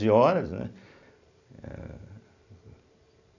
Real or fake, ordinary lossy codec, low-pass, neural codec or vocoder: real; none; 7.2 kHz; none